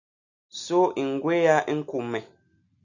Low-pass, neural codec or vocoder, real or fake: 7.2 kHz; none; real